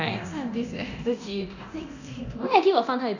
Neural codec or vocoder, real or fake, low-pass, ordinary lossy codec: codec, 24 kHz, 0.9 kbps, DualCodec; fake; 7.2 kHz; none